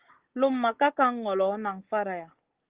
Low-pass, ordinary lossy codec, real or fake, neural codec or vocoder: 3.6 kHz; Opus, 16 kbps; real; none